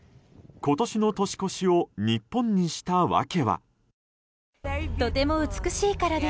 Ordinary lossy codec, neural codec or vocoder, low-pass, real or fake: none; none; none; real